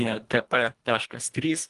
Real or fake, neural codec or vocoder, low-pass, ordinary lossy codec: fake; codec, 24 kHz, 1.5 kbps, HILCodec; 10.8 kHz; Opus, 32 kbps